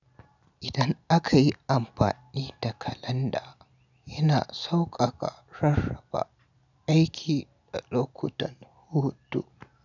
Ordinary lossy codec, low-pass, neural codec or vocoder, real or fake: none; 7.2 kHz; none; real